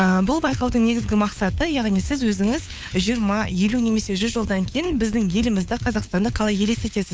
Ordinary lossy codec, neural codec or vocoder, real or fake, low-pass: none; codec, 16 kHz, 4 kbps, FunCodec, trained on LibriTTS, 50 frames a second; fake; none